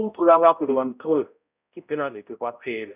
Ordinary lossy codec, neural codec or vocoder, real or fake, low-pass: none; codec, 16 kHz, 0.5 kbps, X-Codec, HuBERT features, trained on balanced general audio; fake; 3.6 kHz